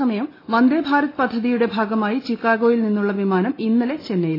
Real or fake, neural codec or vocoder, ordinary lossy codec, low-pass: real; none; AAC, 24 kbps; 5.4 kHz